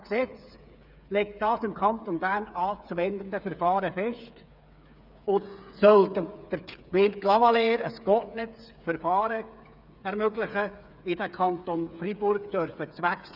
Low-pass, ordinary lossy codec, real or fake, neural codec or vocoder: 5.4 kHz; none; fake; codec, 16 kHz, 8 kbps, FreqCodec, smaller model